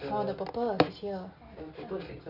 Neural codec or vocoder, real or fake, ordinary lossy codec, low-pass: none; real; none; 5.4 kHz